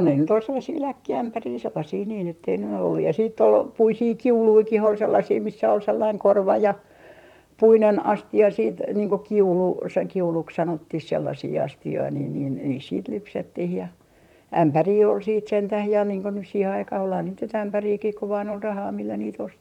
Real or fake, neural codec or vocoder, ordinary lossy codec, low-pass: fake; vocoder, 44.1 kHz, 128 mel bands, Pupu-Vocoder; none; 19.8 kHz